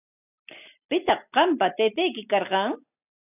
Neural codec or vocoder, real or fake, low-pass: none; real; 3.6 kHz